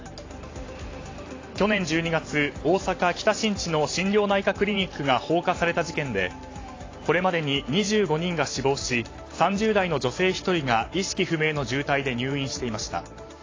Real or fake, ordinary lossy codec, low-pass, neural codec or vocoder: fake; AAC, 32 kbps; 7.2 kHz; vocoder, 44.1 kHz, 128 mel bands every 512 samples, BigVGAN v2